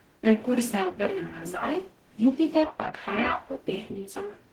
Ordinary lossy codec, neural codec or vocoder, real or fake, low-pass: Opus, 16 kbps; codec, 44.1 kHz, 0.9 kbps, DAC; fake; 19.8 kHz